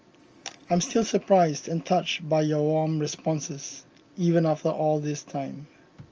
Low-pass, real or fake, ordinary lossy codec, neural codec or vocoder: 7.2 kHz; real; Opus, 24 kbps; none